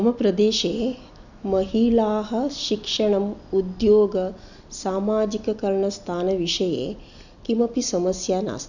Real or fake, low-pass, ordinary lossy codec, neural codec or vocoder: real; 7.2 kHz; none; none